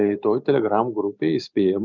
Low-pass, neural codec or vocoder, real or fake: 7.2 kHz; none; real